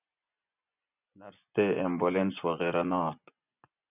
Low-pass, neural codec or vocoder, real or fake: 3.6 kHz; vocoder, 24 kHz, 100 mel bands, Vocos; fake